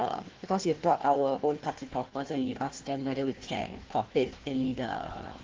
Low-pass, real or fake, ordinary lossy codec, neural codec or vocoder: 7.2 kHz; fake; Opus, 16 kbps; codec, 16 kHz, 1 kbps, FunCodec, trained on Chinese and English, 50 frames a second